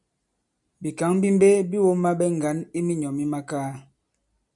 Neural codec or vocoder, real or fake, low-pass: none; real; 10.8 kHz